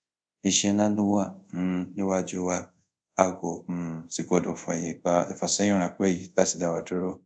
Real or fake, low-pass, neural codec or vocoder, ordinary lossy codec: fake; 9.9 kHz; codec, 24 kHz, 0.5 kbps, DualCodec; none